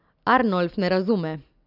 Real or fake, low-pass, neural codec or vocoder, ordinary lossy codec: real; 5.4 kHz; none; Opus, 64 kbps